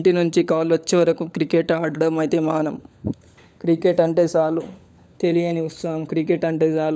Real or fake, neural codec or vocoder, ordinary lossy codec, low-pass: fake; codec, 16 kHz, 16 kbps, FunCodec, trained on LibriTTS, 50 frames a second; none; none